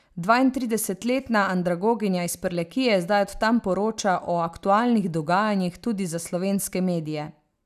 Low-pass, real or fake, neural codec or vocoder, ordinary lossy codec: 14.4 kHz; real; none; none